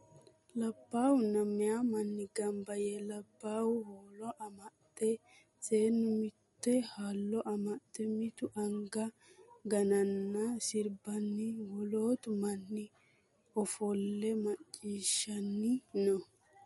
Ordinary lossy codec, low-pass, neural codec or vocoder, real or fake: MP3, 48 kbps; 19.8 kHz; none; real